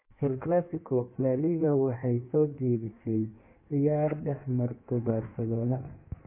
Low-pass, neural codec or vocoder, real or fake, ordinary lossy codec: 3.6 kHz; codec, 16 kHz in and 24 kHz out, 1.1 kbps, FireRedTTS-2 codec; fake; MP3, 32 kbps